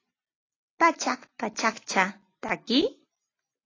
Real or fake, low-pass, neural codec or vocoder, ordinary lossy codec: real; 7.2 kHz; none; AAC, 32 kbps